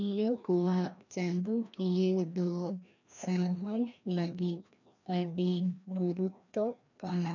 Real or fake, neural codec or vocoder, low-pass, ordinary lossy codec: fake; codec, 16 kHz, 1 kbps, FreqCodec, larger model; 7.2 kHz; none